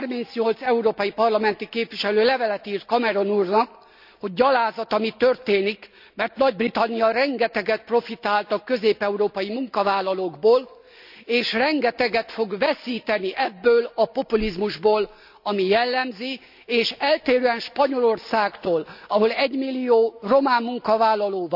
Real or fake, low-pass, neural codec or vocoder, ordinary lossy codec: real; 5.4 kHz; none; none